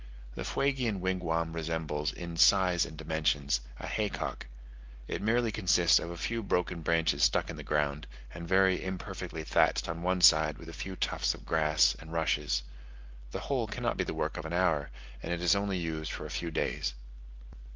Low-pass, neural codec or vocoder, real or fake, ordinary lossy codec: 7.2 kHz; none; real; Opus, 24 kbps